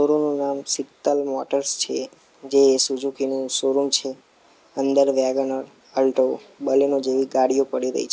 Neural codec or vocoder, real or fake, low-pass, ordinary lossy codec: none; real; none; none